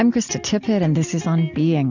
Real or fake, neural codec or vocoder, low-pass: real; none; 7.2 kHz